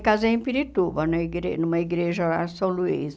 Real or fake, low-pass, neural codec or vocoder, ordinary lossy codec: real; none; none; none